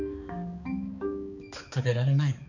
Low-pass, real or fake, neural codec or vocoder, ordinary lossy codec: 7.2 kHz; fake; codec, 16 kHz, 4 kbps, X-Codec, HuBERT features, trained on balanced general audio; none